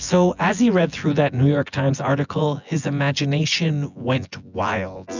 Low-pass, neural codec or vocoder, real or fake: 7.2 kHz; vocoder, 24 kHz, 100 mel bands, Vocos; fake